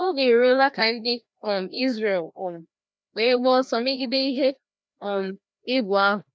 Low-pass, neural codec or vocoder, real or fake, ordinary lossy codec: none; codec, 16 kHz, 1 kbps, FreqCodec, larger model; fake; none